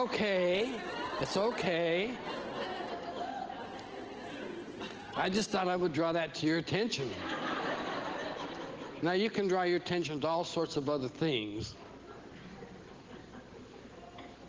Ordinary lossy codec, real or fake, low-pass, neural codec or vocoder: Opus, 16 kbps; real; 7.2 kHz; none